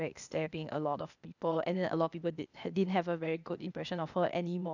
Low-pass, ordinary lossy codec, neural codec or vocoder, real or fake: 7.2 kHz; none; codec, 16 kHz, 0.8 kbps, ZipCodec; fake